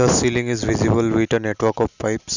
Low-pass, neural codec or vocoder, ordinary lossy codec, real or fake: 7.2 kHz; none; none; real